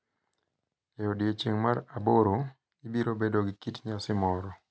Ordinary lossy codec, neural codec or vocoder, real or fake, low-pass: none; none; real; none